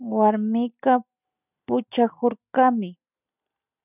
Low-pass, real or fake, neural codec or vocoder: 3.6 kHz; real; none